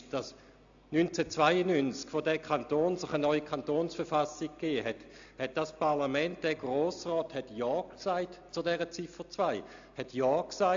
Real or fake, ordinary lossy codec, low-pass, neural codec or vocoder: real; AAC, 64 kbps; 7.2 kHz; none